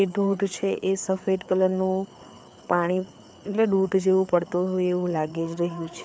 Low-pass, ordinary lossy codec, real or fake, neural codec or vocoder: none; none; fake; codec, 16 kHz, 4 kbps, FreqCodec, larger model